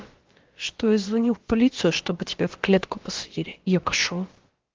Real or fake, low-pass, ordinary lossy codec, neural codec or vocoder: fake; 7.2 kHz; Opus, 16 kbps; codec, 16 kHz, about 1 kbps, DyCAST, with the encoder's durations